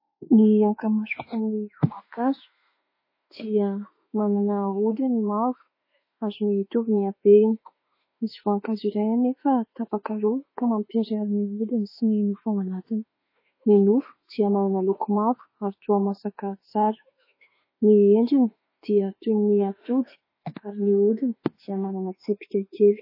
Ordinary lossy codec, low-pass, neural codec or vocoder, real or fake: MP3, 24 kbps; 5.4 kHz; autoencoder, 48 kHz, 32 numbers a frame, DAC-VAE, trained on Japanese speech; fake